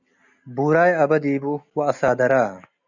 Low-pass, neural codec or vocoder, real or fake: 7.2 kHz; none; real